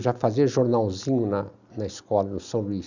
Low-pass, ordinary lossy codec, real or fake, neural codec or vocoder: 7.2 kHz; none; real; none